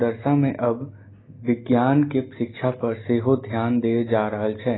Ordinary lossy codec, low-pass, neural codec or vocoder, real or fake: AAC, 16 kbps; 7.2 kHz; none; real